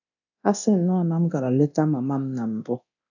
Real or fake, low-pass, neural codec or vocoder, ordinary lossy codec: fake; 7.2 kHz; codec, 24 kHz, 0.9 kbps, DualCodec; none